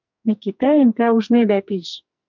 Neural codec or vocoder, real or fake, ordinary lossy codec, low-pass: codec, 44.1 kHz, 2.6 kbps, SNAC; fake; MP3, 64 kbps; 7.2 kHz